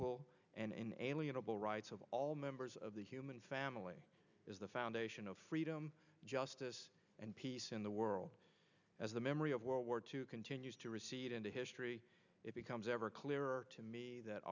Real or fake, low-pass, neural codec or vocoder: real; 7.2 kHz; none